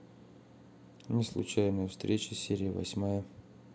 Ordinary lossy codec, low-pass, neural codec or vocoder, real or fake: none; none; none; real